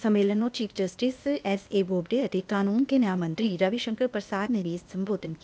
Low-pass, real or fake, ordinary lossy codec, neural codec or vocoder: none; fake; none; codec, 16 kHz, 0.8 kbps, ZipCodec